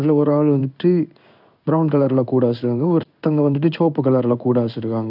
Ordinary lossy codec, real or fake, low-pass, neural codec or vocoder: none; fake; 5.4 kHz; codec, 16 kHz in and 24 kHz out, 1 kbps, XY-Tokenizer